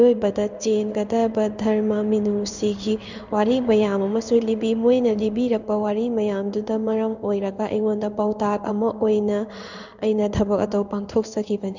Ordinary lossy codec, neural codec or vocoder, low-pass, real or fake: none; codec, 16 kHz in and 24 kHz out, 1 kbps, XY-Tokenizer; 7.2 kHz; fake